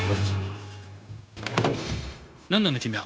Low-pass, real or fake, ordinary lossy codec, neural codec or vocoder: none; fake; none; codec, 16 kHz, 0.9 kbps, LongCat-Audio-Codec